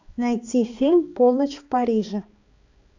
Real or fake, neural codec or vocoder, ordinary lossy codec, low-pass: fake; codec, 16 kHz, 2 kbps, X-Codec, HuBERT features, trained on balanced general audio; AAC, 48 kbps; 7.2 kHz